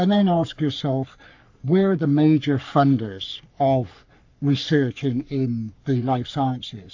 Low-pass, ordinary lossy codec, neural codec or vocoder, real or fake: 7.2 kHz; AAC, 48 kbps; codec, 44.1 kHz, 3.4 kbps, Pupu-Codec; fake